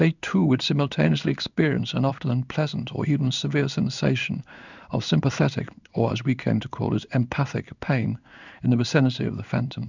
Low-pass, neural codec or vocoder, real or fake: 7.2 kHz; codec, 16 kHz in and 24 kHz out, 1 kbps, XY-Tokenizer; fake